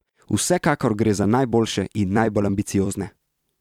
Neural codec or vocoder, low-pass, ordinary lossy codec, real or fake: vocoder, 44.1 kHz, 128 mel bands every 256 samples, BigVGAN v2; 19.8 kHz; none; fake